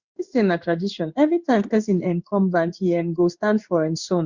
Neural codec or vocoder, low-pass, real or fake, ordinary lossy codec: codec, 16 kHz in and 24 kHz out, 1 kbps, XY-Tokenizer; 7.2 kHz; fake; Opus, 64 kbps